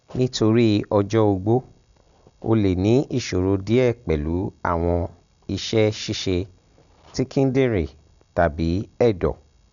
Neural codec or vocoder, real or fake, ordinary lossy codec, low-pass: none; real; none; 7.2 kHz